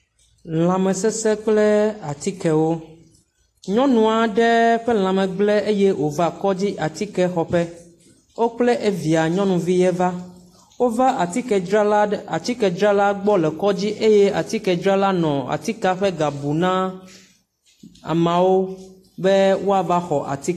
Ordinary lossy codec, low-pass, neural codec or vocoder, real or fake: AAC, 48 kbps; 14.4 kHz; none; real